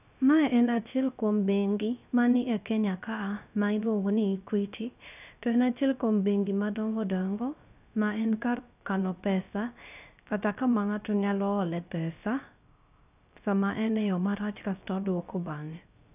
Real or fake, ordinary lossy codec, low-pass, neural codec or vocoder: fake; none; 3.6 kHz; codec, 16 kHz, 0.3 kbps, FocalCodec